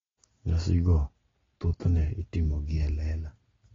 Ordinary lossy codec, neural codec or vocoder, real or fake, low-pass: AAC, 24 kbps; none; real; 7.2 kHz